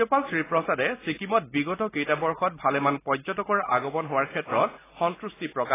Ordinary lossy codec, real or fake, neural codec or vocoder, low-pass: AAC, 16 kbps; real; none; 3.6 kHz